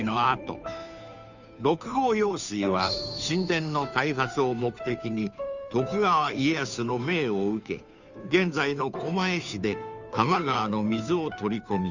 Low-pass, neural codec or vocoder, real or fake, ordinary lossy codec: 7.2 kHz; codec, 16 kHz, 2 kbps, FunCodec, trained on Chinese and English, 25 frames a second; fake; none